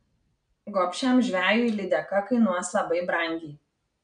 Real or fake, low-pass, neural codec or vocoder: real; 9.9 kHz; none